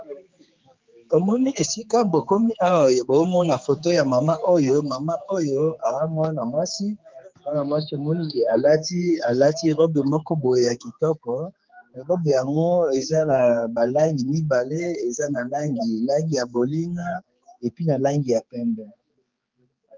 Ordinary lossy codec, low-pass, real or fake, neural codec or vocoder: Opus, 32 kbps; 7.2 kHz; fake; codec, 16 kHz, 4 kbps, X-Codec, HuBERT features, trained on general audio